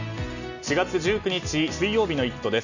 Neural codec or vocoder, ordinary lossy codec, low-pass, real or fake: none; none; 7.2 kHz; real